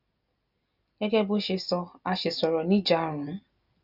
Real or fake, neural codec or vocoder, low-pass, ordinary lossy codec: real; none; 5.4 kHz; none